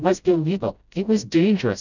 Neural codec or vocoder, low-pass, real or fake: codec, 16 kHz, 0.5 kbps, FreqCodec, smaller model; 7.2 kHz; fake